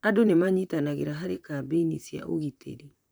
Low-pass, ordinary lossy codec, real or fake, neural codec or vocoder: none; none; fake; vocoder, 44.1 kHz, 128 mel bands, Pupu-Vocoder